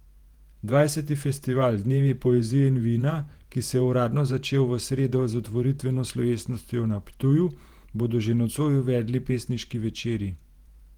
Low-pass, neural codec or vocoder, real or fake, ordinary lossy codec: 19.8 kHz; vocoder, 48 kHz, 128 mel bands, Vocos; fake; Opus, 24 kbps